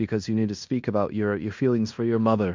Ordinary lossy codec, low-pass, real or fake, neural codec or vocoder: MP3, 64 kbps; 7.2 kHz; fake; codec, 16 kHz in and 24 kHz out, 0.9 kbps, LongCat-Audio-Codec, fine tuned four codebook decoder